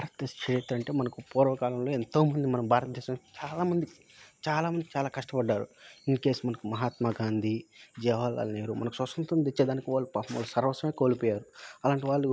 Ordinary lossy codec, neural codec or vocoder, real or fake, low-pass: none; none; real; none